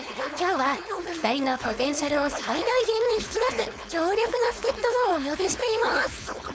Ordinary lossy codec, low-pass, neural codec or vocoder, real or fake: none; none; codec, 16 kHz, 4.8 kbps, FACodec; fake